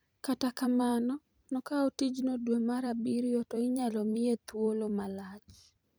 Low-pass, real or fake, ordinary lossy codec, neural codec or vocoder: none; fake; none; vocoder, 44.1 kHz, 128 mel bands every 256 samples, BigVGAN v2